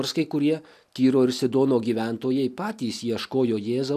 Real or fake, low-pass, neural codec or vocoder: real; 14.4 kHz; none